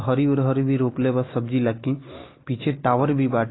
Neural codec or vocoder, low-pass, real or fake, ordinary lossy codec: none; 7.2 kHz; real; AAC, 16 kbps